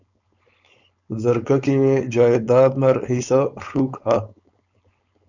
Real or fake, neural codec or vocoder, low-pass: fake; codec, 16 kHz, 4.8 kbps, FACodec; 7.2 kHz